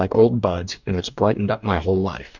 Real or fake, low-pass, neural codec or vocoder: fake; 7.2 kHz; codec, 44.1 kHz, 2.6 kbps, DAC